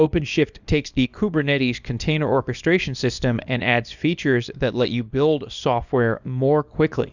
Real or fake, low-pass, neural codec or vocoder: fake; 7.2 kHz; codec, 24 kHz, 0.9 kbps, WavTokenizer, small release